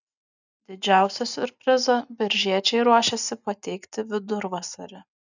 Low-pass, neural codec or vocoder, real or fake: 7.2 kHz; none; real